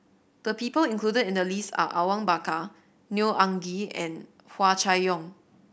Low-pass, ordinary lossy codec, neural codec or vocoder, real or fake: none; none; none; real